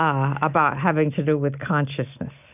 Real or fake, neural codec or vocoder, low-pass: real; none; 3.6 kHz